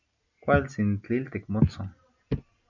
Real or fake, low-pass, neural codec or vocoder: real; 7.2 kHz; none